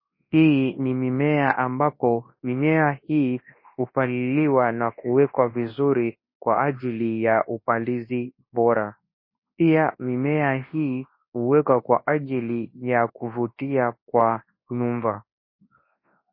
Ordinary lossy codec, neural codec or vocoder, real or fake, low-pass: MP3, 24 kbps; codec, 24 kHz, 0.9 kbps, WavTokenizer, large speech release; fake; 5.4 kHz